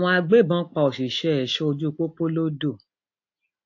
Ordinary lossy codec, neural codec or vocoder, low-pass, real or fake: AAC, 48 kbps; none; 7.2 kHz; real